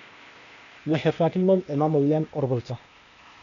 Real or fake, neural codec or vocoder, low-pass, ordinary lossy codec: fake; codec, 16 kHz, 0.8 kbps, ZipCodec; 7.2 kHz; none